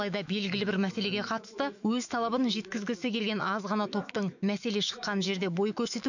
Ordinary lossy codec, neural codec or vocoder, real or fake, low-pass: none; autoencoder, 48 kHz, 128 numbers a frame, DAC-VAE, trained on Japanese speech; fake; 7.2 kHz